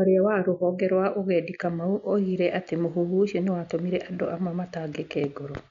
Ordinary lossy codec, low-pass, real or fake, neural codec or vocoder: MP3, 64 kbps; 7.2 kHz; real; none